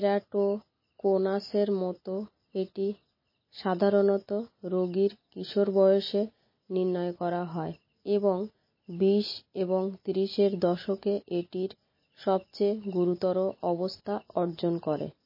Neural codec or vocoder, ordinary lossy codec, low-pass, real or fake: none; MP3, 24 kbps; 5.4 kHz; real